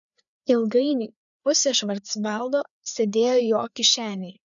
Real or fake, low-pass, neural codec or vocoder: fake; 7.2 kHz; codec, 16 kHz, 4 kbps, FreqCodec, larger model